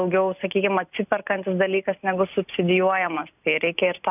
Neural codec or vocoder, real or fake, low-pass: none; real; 3.6 kHz